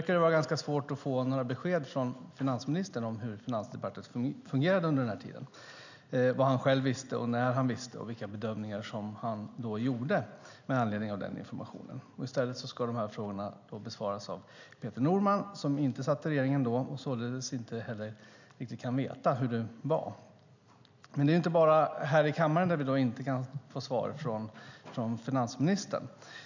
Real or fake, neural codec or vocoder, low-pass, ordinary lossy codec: real; none; 7.2 kHz; none